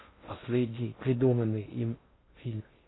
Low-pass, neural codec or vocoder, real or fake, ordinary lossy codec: 7.2 kHz; codec, 16 kHz in and 24 kHz out, 0.6 kbps, FocalCodec, streaming, 2048 codes; fake; AAC, 16 kbps